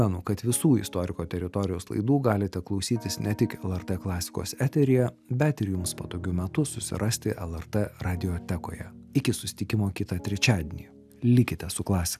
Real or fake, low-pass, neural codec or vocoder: real; 14.4 kHz; none